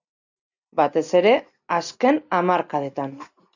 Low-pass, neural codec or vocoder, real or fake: 7.2 kHz; none; real